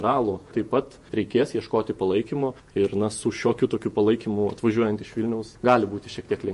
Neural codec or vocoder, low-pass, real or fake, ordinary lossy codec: vocoder, 48 kHz, 128 mel bands, Vocos; 14.4 kHz; fake; MP3, 48 kbps